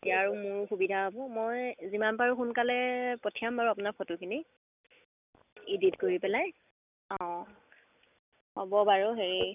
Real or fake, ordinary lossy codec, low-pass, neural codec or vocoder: real; none; 3.6 kHz; none